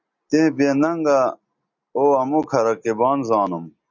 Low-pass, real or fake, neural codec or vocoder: 7.2 kHz; real; none